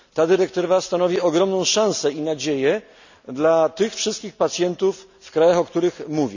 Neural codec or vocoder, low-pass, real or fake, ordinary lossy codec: none; 7.2 kHz; real; none